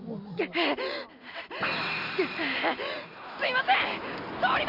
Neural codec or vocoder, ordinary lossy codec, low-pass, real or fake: none; none; 5.4 kHz; real